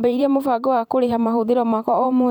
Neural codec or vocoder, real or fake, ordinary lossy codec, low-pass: vocoder, 44.1 kHz, 128 mel bands every 512 samples, BigVGAN v2; fake; none; 19.8 kHz